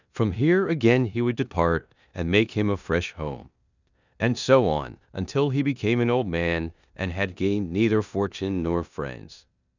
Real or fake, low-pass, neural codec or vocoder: fake; 7.2 kHz; codec, 16 kHz in and 24 kHz out, 0.9 kbps, LongCat-Audio-Codec, four codebook decoder